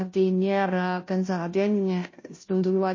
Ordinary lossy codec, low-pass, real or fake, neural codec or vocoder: MP3, 32 kbps; 7.2 kHz; fake; codec, 16 kHz, 0.5 kbps, FunCodec, trained on Chinese and English, 25 frames a second